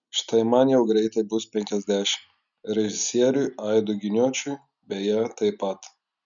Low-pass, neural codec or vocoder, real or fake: 7.2 kHz; none; real